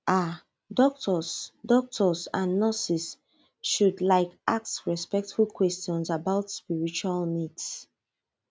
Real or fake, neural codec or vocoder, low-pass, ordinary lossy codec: real; none; none; none